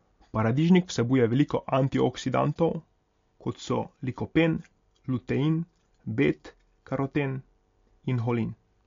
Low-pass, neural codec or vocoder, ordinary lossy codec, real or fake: 7.2 kHz; none; MP3, 48 kbps; real